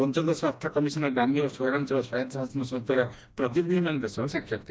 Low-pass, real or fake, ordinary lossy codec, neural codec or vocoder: none; fake; none; codec, 16 kHz, 1 kbps, FreqCodec, smaller model